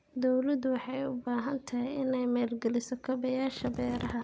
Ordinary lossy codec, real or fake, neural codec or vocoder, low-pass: none; real; none; none